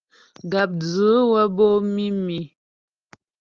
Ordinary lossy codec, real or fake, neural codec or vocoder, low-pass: Opus, 32 kbps; real; none; 7.2 kHz